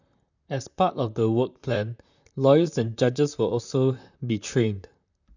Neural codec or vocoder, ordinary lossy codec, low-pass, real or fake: vocoder, 44.1 kHz, 128 mel bands, Pupu-Vocoder; none; 7.2 kHz; fake